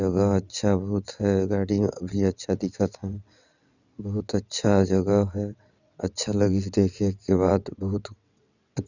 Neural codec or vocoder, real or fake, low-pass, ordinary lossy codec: vocoder, 22.05 kHz, 80 mel bands, WaveNeXt; fake; 7.2 kHz; none